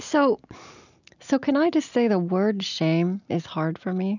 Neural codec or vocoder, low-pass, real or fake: none; 7.2 kHz; real